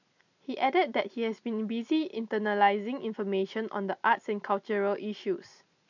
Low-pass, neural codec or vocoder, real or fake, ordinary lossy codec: 7.2 kHz; none; real; none